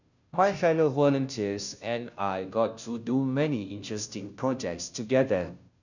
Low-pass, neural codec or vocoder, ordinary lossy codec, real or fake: 7.2 kHz; codec, 16 kHz, 0.5 kbps, FunCodec, trained on Chinese and English, 25 frames a second; none; fake